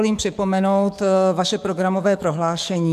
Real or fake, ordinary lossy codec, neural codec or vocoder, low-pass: fake; MP3, 96 kbps; codec, 44.1 kHz, 7.8 kbps, DAC; 14.4 kHz